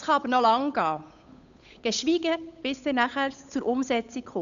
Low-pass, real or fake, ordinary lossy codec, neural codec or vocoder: 7.2 kHz; fake; none; codec, 16 kHz, 8 kbps, FunCodec, trained on Chinese and English, 25 frames a second